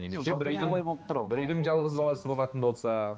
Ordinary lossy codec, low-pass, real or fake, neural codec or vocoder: none; none; fake; codec, 16 kHz, 2 kbps, X-Codec, HuBERT features, trained on balanced general audio